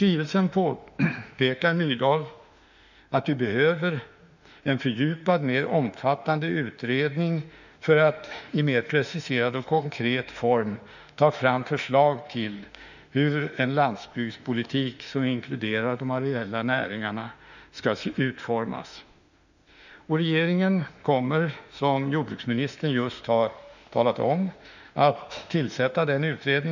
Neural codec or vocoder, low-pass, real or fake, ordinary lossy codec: autoencoder, 48 kHz, 32 numbers a frame, DAC-VAE, trained on Japanese speech; 7.2 kHz; fake; none